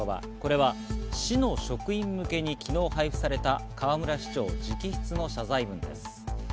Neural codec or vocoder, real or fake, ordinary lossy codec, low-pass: none; real; none; none